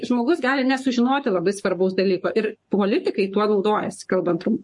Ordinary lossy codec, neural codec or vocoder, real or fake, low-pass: MP3, 48 kbps; codec, 44.1 kHz, 7.8 kbps, Pupu-Codec; fake; 10.8 kHz